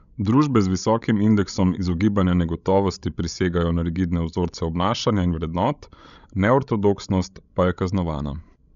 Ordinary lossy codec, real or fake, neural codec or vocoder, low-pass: none; fake; codec, 16 kHz, 16 kbps, FreqCodec, larger model; 7.2 kHz